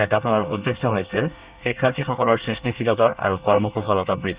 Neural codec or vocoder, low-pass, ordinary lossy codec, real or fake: codec, 24 kHz, 1 kbps, SNAC; 3.6 kHz; Opus, 64 kbps; fake